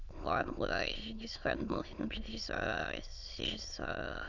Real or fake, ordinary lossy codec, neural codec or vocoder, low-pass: fake; none; autoencoder, 22.05 kHz, a latent of 192 numbers a frame, VITS, trained on many speakers; 7.2 kHz